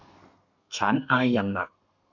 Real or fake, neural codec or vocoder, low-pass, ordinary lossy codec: fake; codec, 32 kHz, 1.9 kbps, SNAC; 7.2 kHz; none